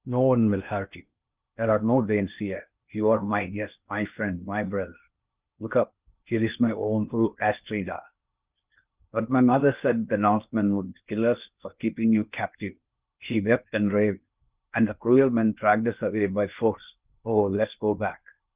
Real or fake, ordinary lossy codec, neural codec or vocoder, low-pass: fake; Opus, 24 kbps; codec, 16 kHz in and 24 kHz out, 0.8 kbps, FocalCodec, streaming, 65536 codes; 3.6 kHz